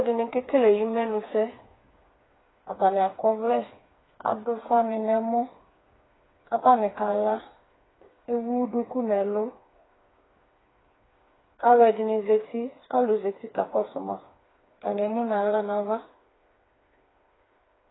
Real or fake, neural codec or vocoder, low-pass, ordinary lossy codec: fake; codec, 16 kHz, 4 kbps, FreqCodec, smaller model; 7.2 kHz; AAC, 16 kbps